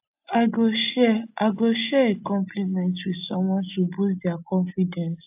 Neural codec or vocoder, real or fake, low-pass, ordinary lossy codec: none; real; 3.6 kHz; AAC, 32 kbps